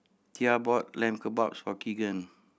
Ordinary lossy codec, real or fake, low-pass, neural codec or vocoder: none; real; none; none